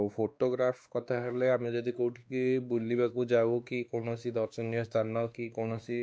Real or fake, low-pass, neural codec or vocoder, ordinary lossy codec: fake; none; codec, 16 kHz, 2 kbps, X-Codec, WavLM features, trained on Multilingual LibriSpeech; none